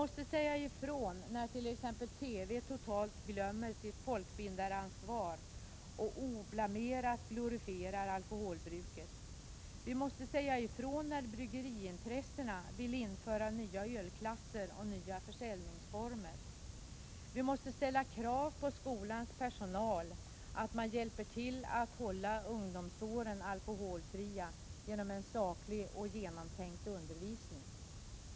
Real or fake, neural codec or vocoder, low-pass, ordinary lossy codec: real; none; none; none